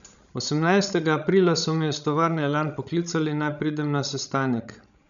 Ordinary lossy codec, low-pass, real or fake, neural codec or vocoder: none; 7.2 kHz; fake; codec, 16 kHz, 16 kbps, FreqCodec, larger model